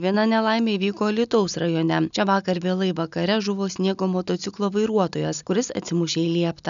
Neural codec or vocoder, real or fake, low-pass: none; real; 7.2 kHz